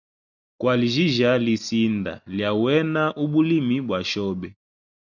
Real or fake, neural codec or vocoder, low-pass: real; none; 7.2 kHz